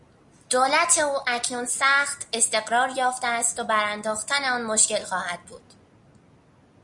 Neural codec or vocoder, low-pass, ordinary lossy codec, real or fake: none; 10.8 kHz; Opus, 64 kbps; real